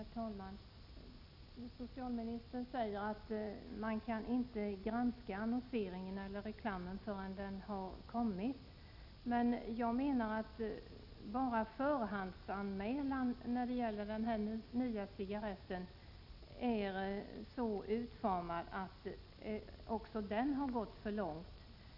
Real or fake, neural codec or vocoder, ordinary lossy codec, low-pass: real; none; none; 5.4 kHz